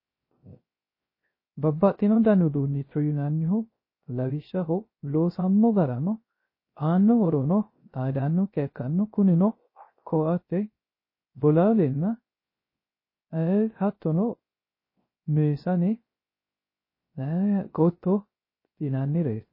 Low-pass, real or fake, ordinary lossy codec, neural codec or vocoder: 5.4 kHz; fake; MP3, 24 kbps; codec, 16 kHz, 0.3 kbps, FocalCodec